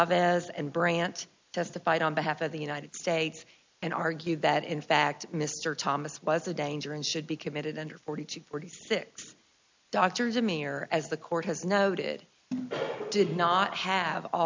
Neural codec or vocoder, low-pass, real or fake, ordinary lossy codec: none; 7.2 kHz; real; MP3, 64 kbps